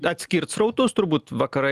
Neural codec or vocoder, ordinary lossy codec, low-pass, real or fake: none; Opus, 32 kbps; 14.4 kHz; real